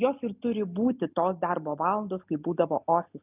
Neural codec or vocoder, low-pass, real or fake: none; 3.6 kHz; real